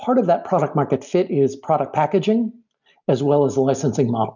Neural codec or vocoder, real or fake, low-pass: none; real; 7.2 kHz